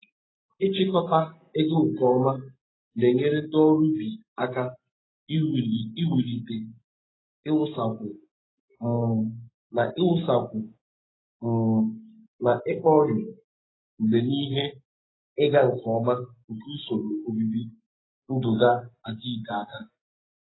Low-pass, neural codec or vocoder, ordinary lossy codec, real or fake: 7.2 kHz; none; AAC, 16 kbps; real